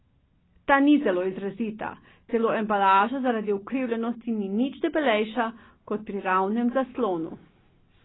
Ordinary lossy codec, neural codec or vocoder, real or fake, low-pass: AAC, 16 kbps; none; real; 7.2 kHz